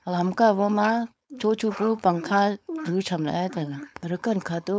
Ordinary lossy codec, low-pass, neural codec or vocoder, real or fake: none; none; codec, 16 kHz, 4.8 kbps, FACodec; fake